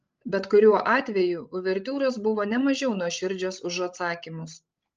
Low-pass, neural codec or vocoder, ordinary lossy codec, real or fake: 7.2 kHz; codec, 16 kHz, 16 kbps, FreqCodec, larger model; Opus, 24 kbps; fake